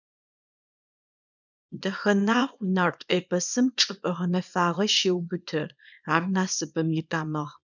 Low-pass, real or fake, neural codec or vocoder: 7.2 kHz; fake; codec, 24 kHz, 0.9 kbps, WavTokenizer, small release